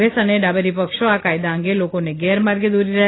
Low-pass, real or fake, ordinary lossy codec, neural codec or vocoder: 7.2 kHz; real; AAC, 16 kbps; none